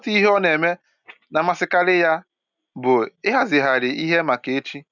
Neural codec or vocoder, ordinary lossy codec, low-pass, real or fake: none; none; 7.2 kHz; real